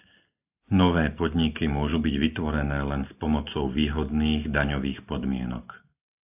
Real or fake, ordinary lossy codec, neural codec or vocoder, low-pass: real; AAC, 32 kbps; none; 3.6 kHz